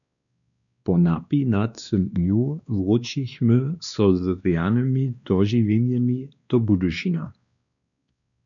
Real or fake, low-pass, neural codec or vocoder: fake; 7.2 kHz; codec, 16 kHz, 2 kbps, X-Codec, WavLM features, trained on Multilingual LibriSpeech